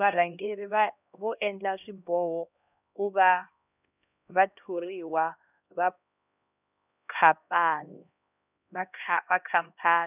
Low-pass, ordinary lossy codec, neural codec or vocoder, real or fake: 3.6 kHz; none; codec, 16 kHz, 1 kbps, X-Codec, HuBERT features, trained on LibriSpeech; fake